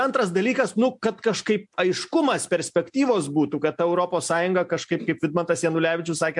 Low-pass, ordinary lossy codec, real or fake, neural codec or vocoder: 10.8 kHz; MP3, 64 kbps; real; none